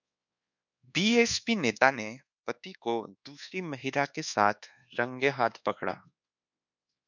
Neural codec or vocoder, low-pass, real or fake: codec, 24 kHz, 1.2 kbps, DualCodec; 7.2 kHz; fake